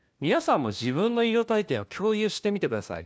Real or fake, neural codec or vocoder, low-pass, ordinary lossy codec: fake; codec, 16 kHz, 1 kbps, FunCodec, trained on LibriTTS, 50 frames a second; none; none